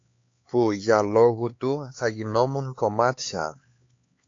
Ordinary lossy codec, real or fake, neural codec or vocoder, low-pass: AAC, 48 kbps; fake; codec, 16 kHz, 4 kbps, X-Codec, HuBERT features, trained on LibriSpeech; 7.2 kHz